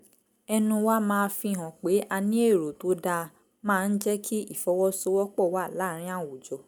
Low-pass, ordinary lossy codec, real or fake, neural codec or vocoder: none; none; real; none